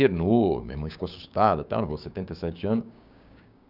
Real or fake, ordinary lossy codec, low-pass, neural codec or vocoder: fake; none; 5.4 kHz; codec, 16 kHz, 6 kbps, DAC